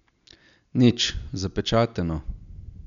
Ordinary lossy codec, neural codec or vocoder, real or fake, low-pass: none; none; real; 7.2 kHz